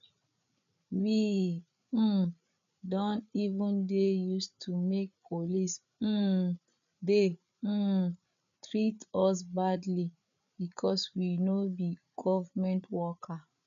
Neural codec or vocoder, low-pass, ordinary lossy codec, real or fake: codec, 16 kHz, 8 kbps, FreqCodec, larger model; 7.2 kHz; none; fake